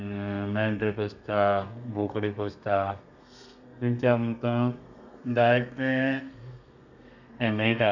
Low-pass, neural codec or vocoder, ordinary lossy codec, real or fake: 7.2 kHz; codec, 32 kHz, 1.9 kbps, SNAC; none; fake